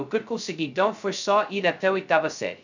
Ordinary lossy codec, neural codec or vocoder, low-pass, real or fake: none; codec, 16 kHz, 0.2 kbps, FocalCodec; 7.2 kHz; fake